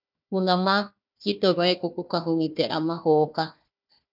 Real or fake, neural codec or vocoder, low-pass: fake; codec, 16 kHz, 1 kbps, FunCodec, trained on Chinese and English, 50 frames a second; 5.4 kHz